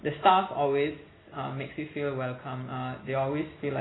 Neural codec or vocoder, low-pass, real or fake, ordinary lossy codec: none; 7.2 kHz; real; AAC, 16 kbps